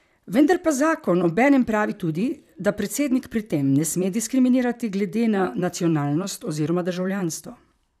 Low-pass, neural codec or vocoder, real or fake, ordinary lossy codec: 14.4 kHz; vocoder, 44.1 kHz, 128 mel bands, Pupu-Vocoder; fake; none